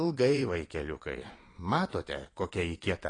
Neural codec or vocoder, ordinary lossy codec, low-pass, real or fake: vocoder, 22.05 kHz, 80 mel bands, Vocos; AAC, 32 kbps; 9.9 kHz; fake